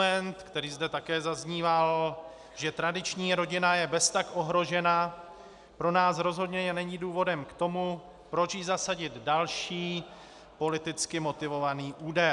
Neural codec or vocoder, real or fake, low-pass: none; real; 10.8 kHz